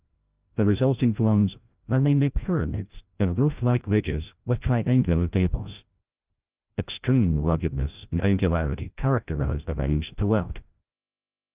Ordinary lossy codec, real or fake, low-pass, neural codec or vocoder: Opus, 32 kbps; fake; 3.6 kHz; codec, 16 kHz, 0.5 kbps, FreqCodec, larger model